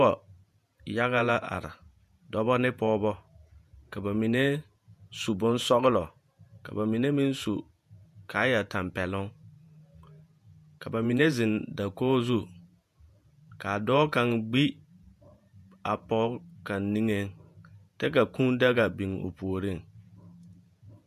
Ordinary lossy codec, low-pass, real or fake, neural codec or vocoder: MP3, 96 kbps; 14.4 kHz; fake; vocoder, 48 kHz, 128 mel bands, Vocos